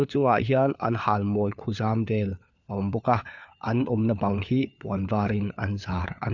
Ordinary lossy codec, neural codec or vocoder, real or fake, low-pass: none; codec, 16 kHz, 4 kbps, FunCodec, trained on LibriTTS, 50 frames a second; fake; 7.2 kHz